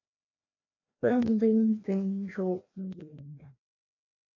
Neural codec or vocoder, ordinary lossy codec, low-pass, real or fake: codec, 16 kHz, 1 kbps, FreqCodec, larger model; AAC, 32 kbps; 7.2 kHz; fake